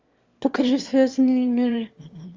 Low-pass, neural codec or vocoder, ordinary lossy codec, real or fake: 7.2 kHz; autoencoder, 22.05 kHz, a latent of 192 numbers a frame, VITS, trained on one speaker; Opus, 32 kbps; fake